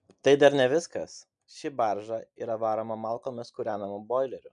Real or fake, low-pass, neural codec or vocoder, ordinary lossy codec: real; 9.9 kHz; none; MP3, 96 kbps